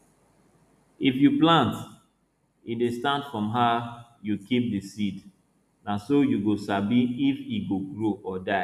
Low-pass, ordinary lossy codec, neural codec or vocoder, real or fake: 14.4 kHz; none; none; real